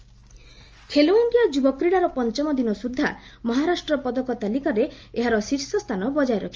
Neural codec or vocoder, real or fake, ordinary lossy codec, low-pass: none; real; Opus, 24 kbps; 7.2 kHz